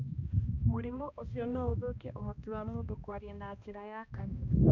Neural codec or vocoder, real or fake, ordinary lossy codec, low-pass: codec, 16 kHz, 1 kbps, X-Codec, HuBERT features, trained on balanced general audio; fake; none; 7.2 kHz